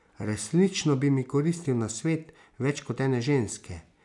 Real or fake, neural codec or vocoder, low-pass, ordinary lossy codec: real; none; 10.8 kHz; none